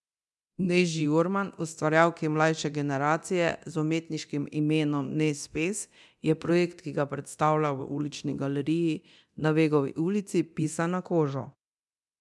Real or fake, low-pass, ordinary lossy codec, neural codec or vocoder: fake; none; none; codec, 24 kHz, 0.9 kbps, DualCodec